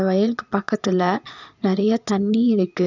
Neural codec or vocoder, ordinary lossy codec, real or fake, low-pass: vocoder, 22.05 kHz, 80 mel bands, Vocos; none; fake; 7.2 kHz